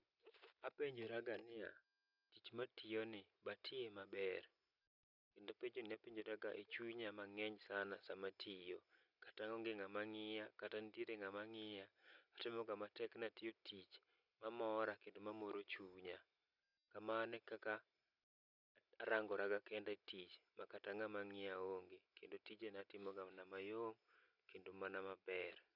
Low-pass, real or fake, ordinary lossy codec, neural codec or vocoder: 5.4 kHz; real; none; none